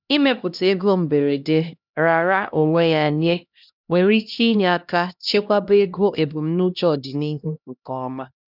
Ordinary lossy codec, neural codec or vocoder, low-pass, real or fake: none; codec, 16 kHz, 1 kbps, X-Codec, HuBERT features, trained on LibriSpeech; 5.4 kHz; fake